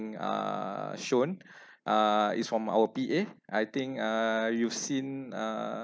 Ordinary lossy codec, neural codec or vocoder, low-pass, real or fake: none; none; none; real